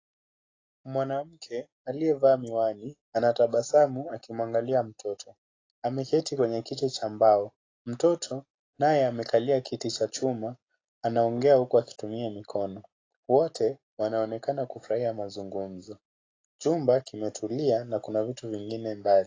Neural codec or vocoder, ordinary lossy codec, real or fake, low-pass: none; AAC, 32 kbps; real; 7.2 kHz